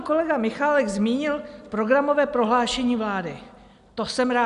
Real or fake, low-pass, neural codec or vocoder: real; 10.8 kHz; none